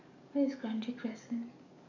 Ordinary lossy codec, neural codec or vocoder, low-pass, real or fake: none; codec, 44.1 kHz, 7.8 kbps, DAC; 7.2 kHz; fake